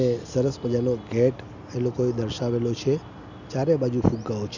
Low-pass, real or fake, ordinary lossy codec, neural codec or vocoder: 7.2 kHz; real; none; none